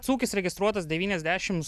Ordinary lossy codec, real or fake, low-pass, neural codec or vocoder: AAC, 96 kbps; real; 14.4 kHz; none